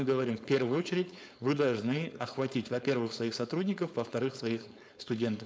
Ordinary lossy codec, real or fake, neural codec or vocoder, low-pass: none; fake; codec, 16 kHz, 4.8 kbps, FACodec; none